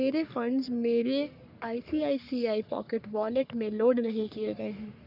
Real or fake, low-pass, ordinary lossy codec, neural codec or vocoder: fake; 5.4 kHz; none; codec, 44.1 kHz, 3.4 kbps, Pupu-Codec